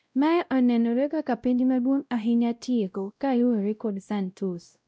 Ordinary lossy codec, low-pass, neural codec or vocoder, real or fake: none; none; codec, 16 kHz, 0.5 kbps, X-Codec, WavLM features, trained on Multilingual LibriSpeech; fake